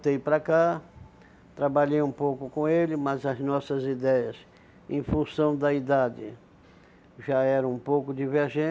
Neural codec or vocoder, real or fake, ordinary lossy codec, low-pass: none; real; none; none